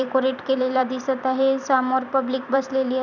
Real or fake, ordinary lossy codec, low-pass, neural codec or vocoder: fake; none; 7.2 kHz; vocoder, 44.1 kHz, 128 mel bands every 256 samples, BigVGAN v2